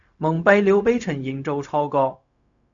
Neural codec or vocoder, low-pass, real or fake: codec, 16 kHz, 0.4 kbps, LongCat-Audio-Codec; 7.2 kHz; fake